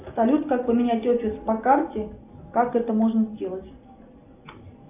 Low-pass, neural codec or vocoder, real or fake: 3.6 kHz; none; real